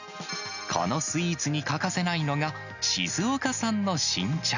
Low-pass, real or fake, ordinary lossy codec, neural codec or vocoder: 7.2 kHz; real; none; none